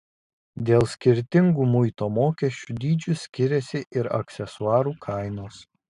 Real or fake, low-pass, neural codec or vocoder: real; 10.8 kHz; none